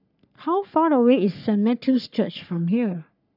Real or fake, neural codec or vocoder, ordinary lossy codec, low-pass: fake; codec, 44.1 kHz, 3.4 kbps, Pupu-Codec; none; 5.4 kHz